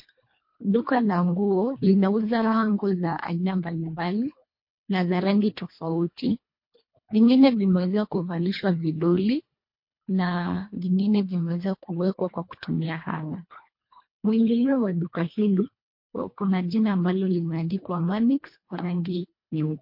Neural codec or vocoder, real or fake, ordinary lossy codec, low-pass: codec, 24 kHz, 1.5 kbps, HILCodec; fake; MP3, 32 kbps; 5.4 kHz